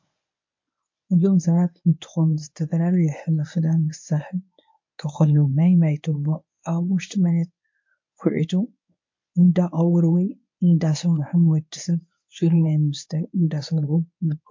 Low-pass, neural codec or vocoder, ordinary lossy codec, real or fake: 7.2 kHz; codec, 24 kHz, 0.9 kbps, WavTokenizer, medium speech release version 1; MP3, 48 kbps; fake